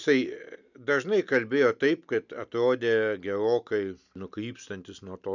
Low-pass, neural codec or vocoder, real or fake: 7.2 kHz; none; real